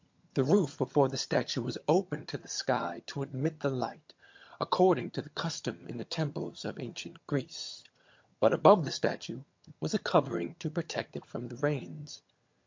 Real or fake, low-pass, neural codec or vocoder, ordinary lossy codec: fake; 7.2 kHz; vocoder, 22.05 kHz, 80 mel bands, HiFi-GAN; MP3, 48 kbps